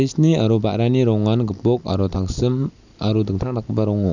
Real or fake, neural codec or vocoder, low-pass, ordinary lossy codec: real; none; 7.2 kHz; none